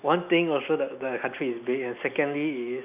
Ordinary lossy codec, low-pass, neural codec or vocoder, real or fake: none; 3.6 kHz; none; real